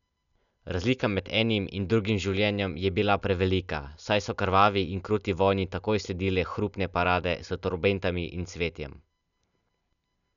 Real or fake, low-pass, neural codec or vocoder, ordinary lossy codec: real; 7.2 kHz; none; none